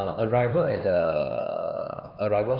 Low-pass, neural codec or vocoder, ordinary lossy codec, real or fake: 5.4 kHz; codec, 16 kHz, 2 kbps, X-Codec, HuBERT features, trained on LibriSpeech; none; fake